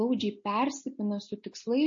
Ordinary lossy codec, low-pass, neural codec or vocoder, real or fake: MP3, 32 kbps; 7.2 kHz; none; real